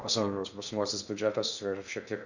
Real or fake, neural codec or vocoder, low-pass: fake; codec, 16 kHz in and 24 kHz out, 0.6 kbps, FocalCodec, streaming, 2048 codes; 7.2 kHz